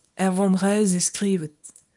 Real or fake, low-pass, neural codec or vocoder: fake; 10.8 kHz; codec, 24 kHz, 0.9 kbps, WavTokenizer, small release